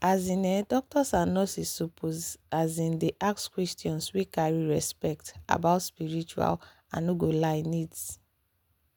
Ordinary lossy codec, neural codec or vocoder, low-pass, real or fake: none; none; none; real